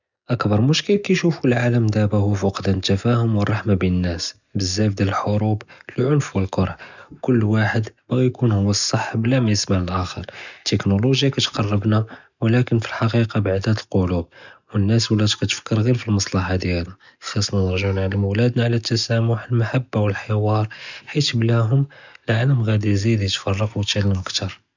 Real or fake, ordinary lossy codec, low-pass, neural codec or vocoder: real; none; 7.2 kHz; none